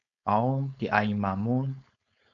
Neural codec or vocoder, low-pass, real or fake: codec, 16 kHz, 4.8 kbps, FACodec; 7.2 kHz; fake